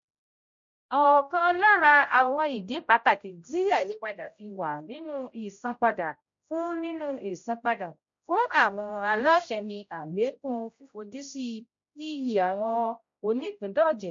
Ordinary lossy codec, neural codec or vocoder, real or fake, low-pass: MP3, 48 kbps; codec, 16 kHz, 0.5 kbps, X-Codec, HuBERT features, trained on general audio; fake; 7.2 kHz